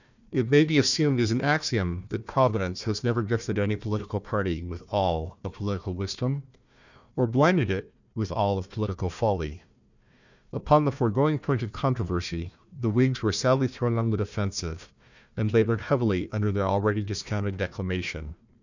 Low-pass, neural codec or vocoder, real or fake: 7.2 kHz; codec, 16 kHz, 1 kbps, FunCodec, trained on Chinese and English, 50 frames a second; fake